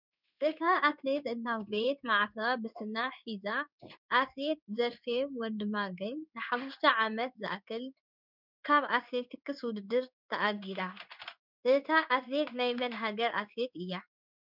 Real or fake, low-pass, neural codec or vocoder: fake; 5.4 kHz; codec, 16 kHz in and 24 kHz out, 1 kbps, XY-Tokenizer